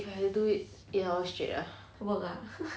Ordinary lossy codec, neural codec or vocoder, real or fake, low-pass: none; none; real; none